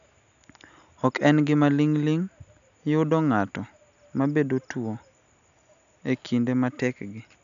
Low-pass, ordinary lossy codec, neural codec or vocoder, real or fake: 7.2 kHz; none; none; real